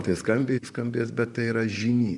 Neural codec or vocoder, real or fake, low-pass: vocoder, 44.1 kHz, 128 mel bands, Pupu-Vocoder; fake; 10.8 kHz